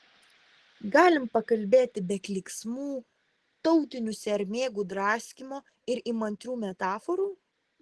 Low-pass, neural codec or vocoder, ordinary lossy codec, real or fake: 10.8 kHz; none; Opus, 16 kbps; real